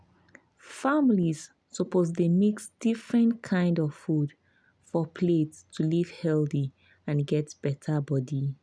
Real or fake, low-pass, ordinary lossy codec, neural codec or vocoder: real; none; none; none